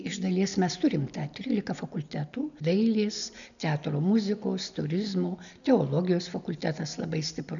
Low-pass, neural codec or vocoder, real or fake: 7.2 kHz; none; real